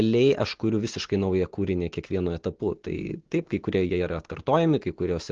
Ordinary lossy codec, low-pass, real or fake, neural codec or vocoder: Opus, 16 kbps; 7.2 kHz; real; none